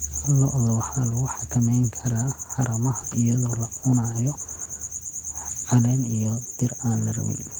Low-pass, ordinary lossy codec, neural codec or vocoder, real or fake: 19.8 kHz; Opus, 16 kbps; none; real